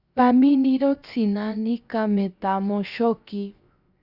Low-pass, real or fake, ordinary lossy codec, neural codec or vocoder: 5.4 kHz; fake; Opus, 64 kbps; codec, 16 kHz, 0.7 kbps, FocalCodec